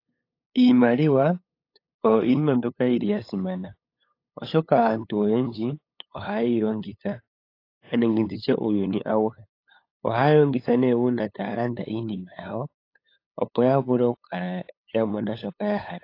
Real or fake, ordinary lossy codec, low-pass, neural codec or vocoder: fake; AAC, 32 kbps; 5.4 kHz; codec, 16 kHz, 8 kbps, FunCodec, trained on LibriTTS, 25 frames a second